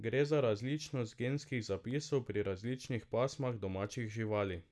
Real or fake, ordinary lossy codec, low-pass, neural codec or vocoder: real; none; none; none